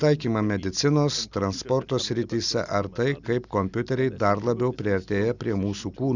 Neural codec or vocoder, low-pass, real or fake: none; 7.2 kHz; real